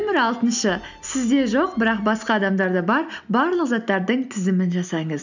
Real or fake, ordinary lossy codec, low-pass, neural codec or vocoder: real; none; 7.2 kHz; none